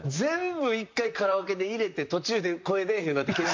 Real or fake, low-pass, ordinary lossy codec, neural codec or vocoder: fake; 7.2 kHz; MP3, 48 kbps; vocoder, 44.1 kHz, 128 mel bands, Pupu-Vocoder